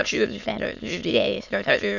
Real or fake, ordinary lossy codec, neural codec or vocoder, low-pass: fake; none; autoencoder, 22.05 kHz, a latent of 192 numbers a frame, VITS, trained on many speakers; 7.2 kHz